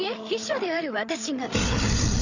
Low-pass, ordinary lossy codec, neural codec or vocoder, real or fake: 7.2 kHz; none; codec, 16 kHz, 8 kbps, FreqCodec, larger model; fake